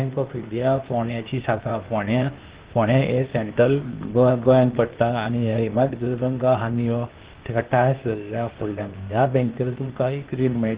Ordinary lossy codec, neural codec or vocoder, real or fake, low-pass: Opus, 16 kbps; codec, 16 kHz, 0.8 kbps, ZipCodec; fake; 3.6 kHz